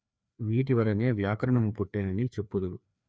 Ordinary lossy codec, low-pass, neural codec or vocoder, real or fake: none; none; codec, 16 kHz, 2 kbps, FreqCodec, larger model; fake